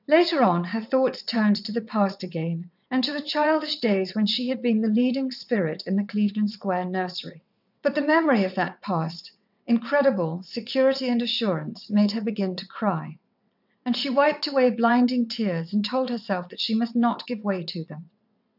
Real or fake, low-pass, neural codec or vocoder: fake; 5.4 kHz; vocoder, 22.05 kHz, 80 mel bands, WaveNeXt